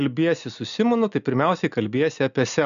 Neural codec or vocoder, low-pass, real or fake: none; 7.2 kHz; real